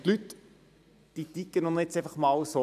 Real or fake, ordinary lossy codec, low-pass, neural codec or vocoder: fake; none; 14.4 kHz; vocoder, 44.1 kHz, 128 mel bands every 512 samples, BigVGAN v2